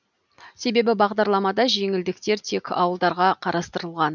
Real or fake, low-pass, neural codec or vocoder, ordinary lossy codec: real; 7.2 kHz; none; none